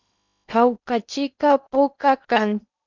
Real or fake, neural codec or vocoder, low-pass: fake; codec, 16 kHz in and 24 kHz out, 0.8 kbps, FocalCodec, streaming, 65536 codes; 7.2 kHz